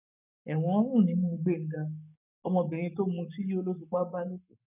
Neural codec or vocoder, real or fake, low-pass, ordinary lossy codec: codec, 44.1 kHz, 7.8 kbps, Pupu-Codec; fake; 3.6 kHz; none